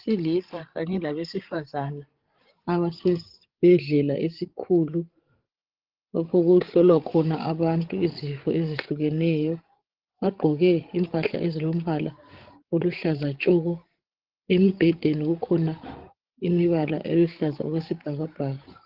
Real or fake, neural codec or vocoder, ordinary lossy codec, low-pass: fake; codec, 16 kHz, 16 kbps, FreqCodec, larger model; Opus, 16 kbps; 5.4 kHz